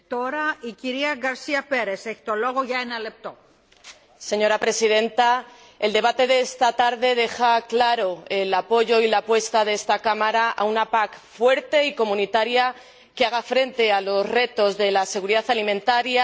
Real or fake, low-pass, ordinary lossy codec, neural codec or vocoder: real; none; none; none